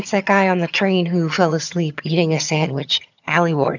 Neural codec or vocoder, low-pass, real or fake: vocoder, 22.05 kHz, 80 mel bands, HiFi-GAN; 7.2 kHz; fake